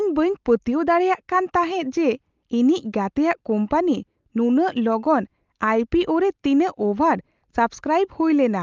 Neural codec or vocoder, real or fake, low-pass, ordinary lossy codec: none; real; 7.2 kHz; Opus, 32 kbps